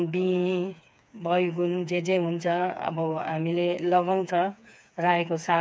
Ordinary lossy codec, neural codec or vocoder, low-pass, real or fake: none; codec, 16 kHz, 4 kbps, FreqCodec, smaller model; none; fake